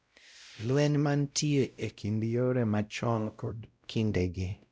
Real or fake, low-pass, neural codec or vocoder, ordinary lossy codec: fake; none; codec, 16 kHz, 0.5 kbps, X-Codec, WavLM features, trained on Multilingual LibriSpeech; none